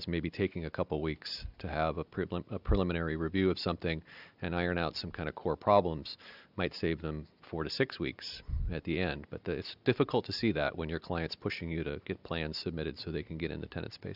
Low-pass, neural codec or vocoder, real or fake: 5.4 kHz; none; real